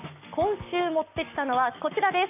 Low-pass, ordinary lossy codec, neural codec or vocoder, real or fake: 3.6 kHz; none; codec, 44.1 kHz, 7.8 kbps, Pupu-Codec; fake